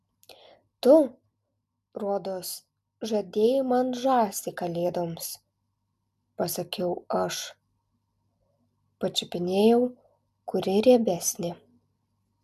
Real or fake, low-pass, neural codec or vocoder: real; 14.4 kHz; none